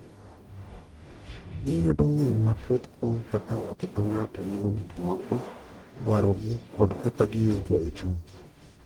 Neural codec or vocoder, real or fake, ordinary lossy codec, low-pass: codec, 44.1 kHz, 0.9 kbps, DAC; fake; Opus, 24 kbps; 19.8 kHz